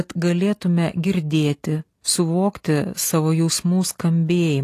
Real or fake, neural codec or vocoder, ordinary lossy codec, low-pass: real; none; AAC, 48 kbps; 14.4 kHz